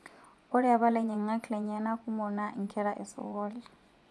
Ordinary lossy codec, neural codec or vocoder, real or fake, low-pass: none; vocoder, 24 kHz, 100 mel bands, Vocos; fake; none